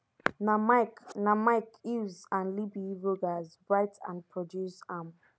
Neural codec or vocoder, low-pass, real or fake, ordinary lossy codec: none; none; real; none